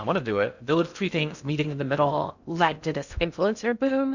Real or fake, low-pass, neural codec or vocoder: fake; 7.2 kHz; codec, 16 kHz in and 24 kHz out, 0.6 kbps, FocalCodec, streaming, 2048 codes